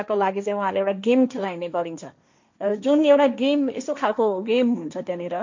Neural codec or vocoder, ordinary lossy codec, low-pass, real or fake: codec, 16 kHz, 1.1 kbps, Voila-Tokenizer; MP3, 48 kbps; 7.2 kHz; fake